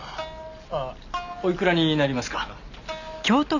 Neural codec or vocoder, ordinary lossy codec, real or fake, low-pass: none; none; real; 7.2 kHz